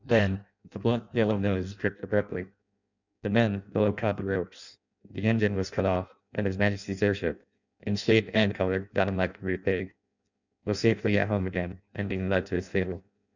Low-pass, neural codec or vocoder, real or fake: 7.2 kHz; codec, 16 kHz in and 24 kHz out, 0.6 kbps, FireRedTTS-2 codec; fake